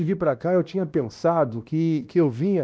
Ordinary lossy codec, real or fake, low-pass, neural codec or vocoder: none; fake; none; codec, 16 kHz, 1 kbps, X-Codec, WavLM features, trained on Multilingual LibriSpeech